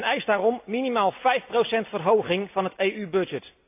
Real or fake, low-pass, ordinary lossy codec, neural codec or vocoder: real; 3.6 kHz; AAC, 32 kbps; none